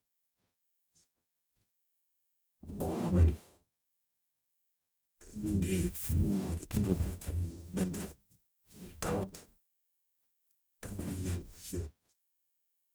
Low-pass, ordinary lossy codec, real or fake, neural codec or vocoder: none; none; fake; codec, 44.1 kHz, 0.9 kbps, DAC